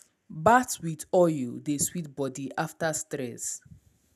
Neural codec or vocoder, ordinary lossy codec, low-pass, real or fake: none; none; 14.4 kHz; real